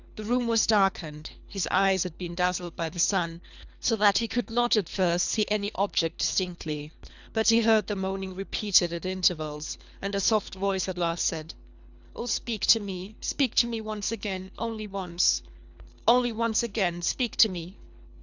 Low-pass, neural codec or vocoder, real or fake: 7.2 kHz; codec, 24 kHz, 3 kbps, HILCodec; fake